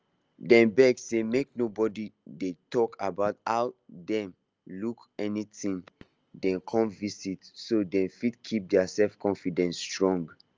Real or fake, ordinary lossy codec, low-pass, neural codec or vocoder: real; Opus, 24 kbps; 7.2 kHz; none